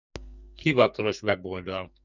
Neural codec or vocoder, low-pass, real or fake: codec, 44.1 kHz, 2.6 kbps, SNAC; 7.2 kHz; fake